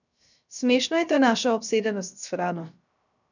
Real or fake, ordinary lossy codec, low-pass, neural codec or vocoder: fake; none; 7.2 kHz; codec, 16 kHz, 0.3 kbps, FocalCodec